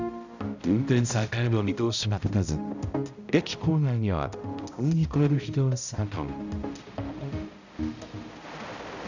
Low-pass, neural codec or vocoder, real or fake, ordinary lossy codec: 7.2 kHz; codec, 16 kHz, 0.5 kbps, X-Codec, HuBERT features, trained on balanced general audio; fake; none